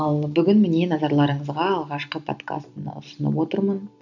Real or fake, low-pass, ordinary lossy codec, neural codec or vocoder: real; 7.2 kHz; none; none